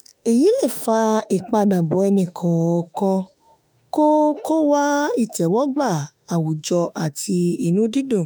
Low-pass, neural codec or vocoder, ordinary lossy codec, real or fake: none; autoencoder, 48 kHz, 32 numbers a frame, DAC-VAE, trained on Japanese speech; none; fake